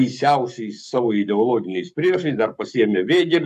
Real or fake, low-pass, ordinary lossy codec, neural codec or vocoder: fake; 14.4 kHz; AAC, 96 kbps; codec, 44.1 kHz, 7.8 kbps, Pupu-Codec